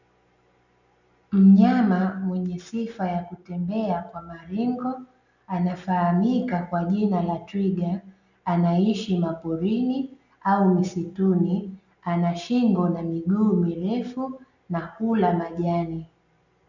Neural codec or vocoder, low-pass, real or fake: none; 7.2 kHz; real